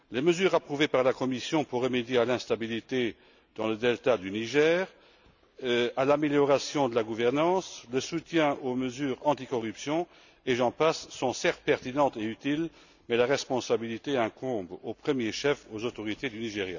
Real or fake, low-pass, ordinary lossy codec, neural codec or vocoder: real; 7.2 kHz; none; none